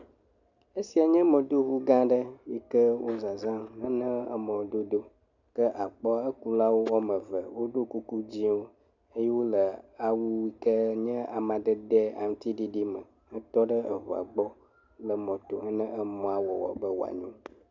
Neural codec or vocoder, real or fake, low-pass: none; real; 7.2 kHz